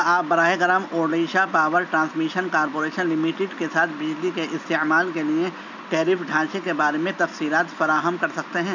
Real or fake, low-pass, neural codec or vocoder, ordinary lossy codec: real; 7.2 kHz; none; none